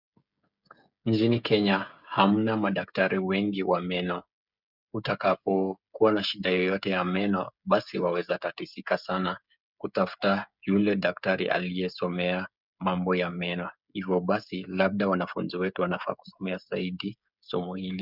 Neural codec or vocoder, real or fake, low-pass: codec, 16 kHz, 16 kbps, FreqCodec, smaller model; fake; 5.4 kHz